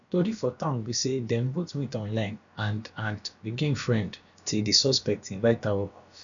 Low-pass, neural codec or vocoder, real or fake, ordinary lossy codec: 7.2 kHz; codec, 16 kHz, about 1 kbps, DyCAST, with the encoder's durations; fake; none